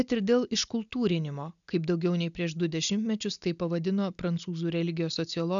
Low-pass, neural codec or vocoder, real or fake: 7.2 kHz; none; real